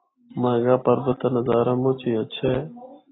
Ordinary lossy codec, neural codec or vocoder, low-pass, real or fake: AAC, 16 kbps; none; 7.2 kHz; real